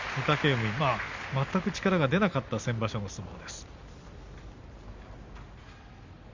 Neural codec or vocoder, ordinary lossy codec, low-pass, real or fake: none; Opus, 64 kbps; 7.2 kHz; real